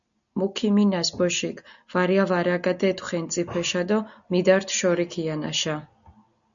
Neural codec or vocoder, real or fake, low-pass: none; real; 7.2 kHz